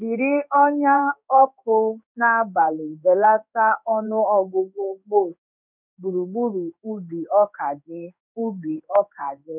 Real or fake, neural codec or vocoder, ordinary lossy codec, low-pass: fake; codec, 16 kHz in and 24 kHz out, 1 kbps, XY-Tokenizer; none; 3.6 kHz